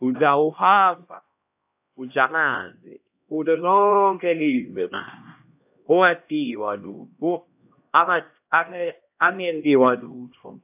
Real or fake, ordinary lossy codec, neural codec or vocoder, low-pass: fake; AAC, 32 kbps; codec, 16 kHz, 1 kbps, X-Codec, HuBERT features, trained on LibriSpeech; 3.6 kHz